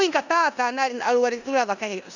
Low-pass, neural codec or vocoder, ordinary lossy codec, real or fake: 7.2 kHz; codec, 16 kHz in and 24 kHz out, 0.9 kbps, LongCat-Audio-Codec, four codebook decoder; none; fake